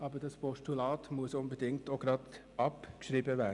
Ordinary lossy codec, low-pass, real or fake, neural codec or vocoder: none; 10.8 kHz; real; none